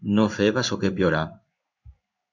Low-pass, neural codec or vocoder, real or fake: 7.2 kHz; codec, 16 kHz in and 24 kHz out, 1 kbps, XY-Tokenizer; fake